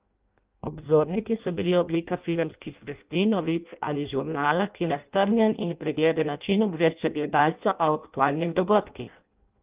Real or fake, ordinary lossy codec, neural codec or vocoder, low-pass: fake; Opus, 32 kbps; codec, 16 kHz in and 24 kHz out, 0.6 kbps, FireRedTTS-2 codec; 3.6 kHz